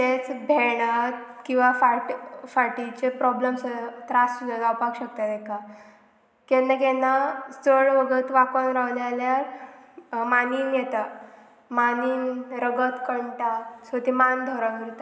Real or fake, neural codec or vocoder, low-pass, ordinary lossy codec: real; none; none; none